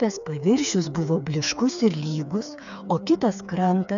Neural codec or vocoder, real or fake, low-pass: codec, 16 kHz, 4 kbps, FreqCodec, smaller model; fake; 7.2 kHz